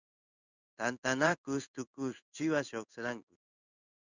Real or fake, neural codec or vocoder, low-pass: fake; codec, 16 kHz in and 24 kHz out, 1 kbps, XY-Tokenizer; 7.2 kHz